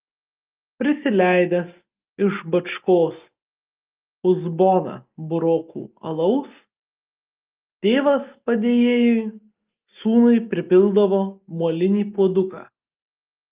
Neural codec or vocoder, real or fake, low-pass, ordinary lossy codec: none; real; 3.6 kHz; Opus, 32 kbps